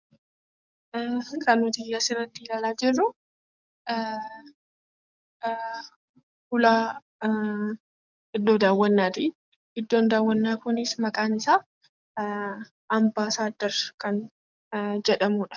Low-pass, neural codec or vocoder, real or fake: 7.2 kHz; codec, 44.1 kHz, 7.8 kbps, DAC; fake